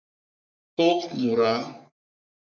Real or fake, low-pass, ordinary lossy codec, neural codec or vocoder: fake; 7.2 kHz; MP3, 64 kbps; codec, 44.1 kHz, 3.4 kbps, Pupu-Codec